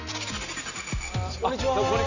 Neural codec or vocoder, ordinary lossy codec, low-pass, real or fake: none; none; 7.2 kHz; real